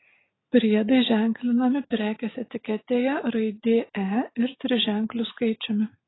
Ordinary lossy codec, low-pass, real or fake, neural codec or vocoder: AAC, 16 kbps; 7.2 kHz; real; none